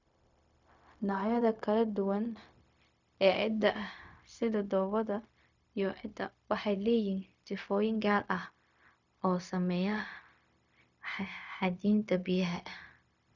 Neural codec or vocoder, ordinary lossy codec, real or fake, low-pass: codec, 16 kHz, 0.4 kbps, LongCat-Audio-Codec; none; fake; 7.2 kHz